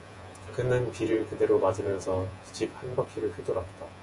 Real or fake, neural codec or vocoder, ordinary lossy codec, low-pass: fake; vocoder, 48 kHz, 128 mel bands, Vocos; MP3, 48 kbps; 10.8 kHz